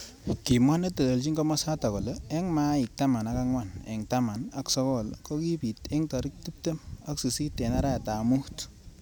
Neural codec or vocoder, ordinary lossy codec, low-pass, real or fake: none; none; none; real